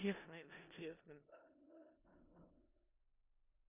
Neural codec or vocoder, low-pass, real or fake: codec, 16 kHz in and 24 kHz out, 0.4 kbps, LongCat-Audio-Codec, four codebook decoder; 3.6 kHz; fake